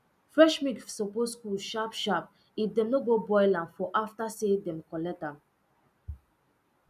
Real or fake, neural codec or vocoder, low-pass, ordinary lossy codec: real; none; 14.4 kHz; none